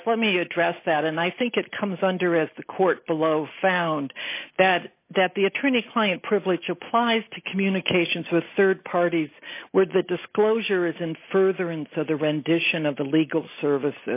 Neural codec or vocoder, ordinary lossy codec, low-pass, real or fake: none; AAC, 32 kbps; 3.6 kHz; real